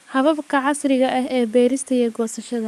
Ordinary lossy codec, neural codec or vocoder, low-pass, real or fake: none; codec, 44.1 kHz, 7.8 kbps, DAC; 14.4 kHz; fake